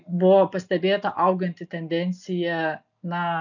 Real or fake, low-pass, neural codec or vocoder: real; 7.2 kHz; none